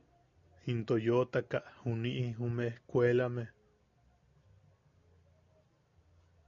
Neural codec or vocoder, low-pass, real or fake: none; 7.2 kHz; real